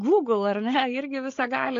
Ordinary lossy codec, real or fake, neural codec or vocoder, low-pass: AAC, 48 kbps; fake; codec, 16 kHz, 8 kbps, FreqCodec, larger model; 7.2 kHz